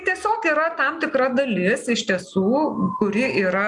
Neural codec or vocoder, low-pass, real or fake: none; 10.8 kHz; real